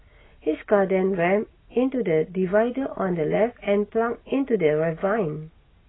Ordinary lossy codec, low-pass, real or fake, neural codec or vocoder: AAC, 16 kbps; 7.2 kHz; real; none